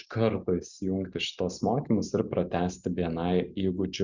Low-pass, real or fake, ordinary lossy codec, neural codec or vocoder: 7.2 kHz; real; Opus, 64 kbps; none